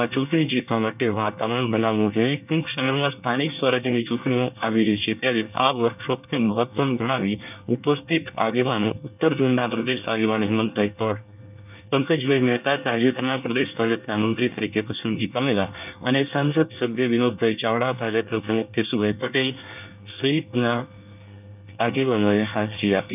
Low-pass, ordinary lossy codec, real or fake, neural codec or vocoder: 3.6 kHz; none; fake; codec, 24 kHz, 1 kbps, SNAC